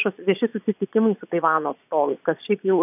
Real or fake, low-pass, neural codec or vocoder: fake; 3.6 kHz; vocoder, 22.05 kHz, 80 mel bands, Vocos